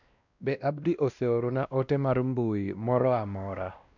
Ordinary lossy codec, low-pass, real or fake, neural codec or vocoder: none; 7.2 kHz; fake; codec, 16 kHz, 1 kbps, X-Codec, WavLM features, trained on Multilingual LibriSpeech